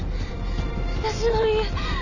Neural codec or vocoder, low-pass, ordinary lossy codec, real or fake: none; 7.2 kHz; none; real